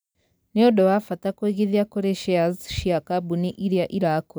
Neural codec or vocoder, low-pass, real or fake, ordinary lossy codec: none; none; real; none